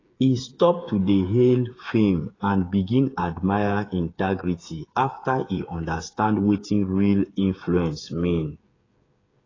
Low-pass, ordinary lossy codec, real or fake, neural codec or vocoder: 7.2 kHz; AAC, 32 kbps; fake; codec, 16 kHz, 8 kbps, FreqCodec, smaller model